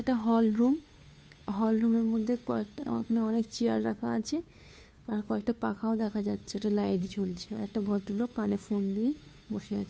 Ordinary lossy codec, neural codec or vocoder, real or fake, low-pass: none; codec, 16 kHz, 2 kbps, FunCodec, trained on Chinese and English, 25 frames a second; fake; none